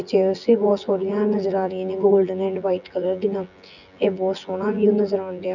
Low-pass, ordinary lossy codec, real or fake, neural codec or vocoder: 7.2 kHz; none; fake; vocoder, 24 kHz, 100 mel bands, Vocos